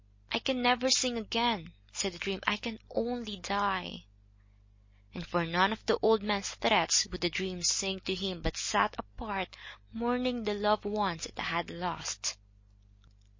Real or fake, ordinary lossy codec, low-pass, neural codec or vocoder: real; MP3, 32 kbps; 7.2 kHz; none